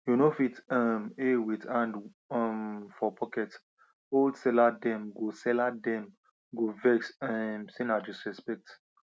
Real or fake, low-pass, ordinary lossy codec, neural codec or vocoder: real; none; none; none